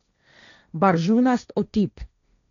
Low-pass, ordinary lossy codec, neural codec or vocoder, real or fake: 7.2 kHz; none; codec, 16 kHz, 1.1 kbps, Voila-Tokenizer; fake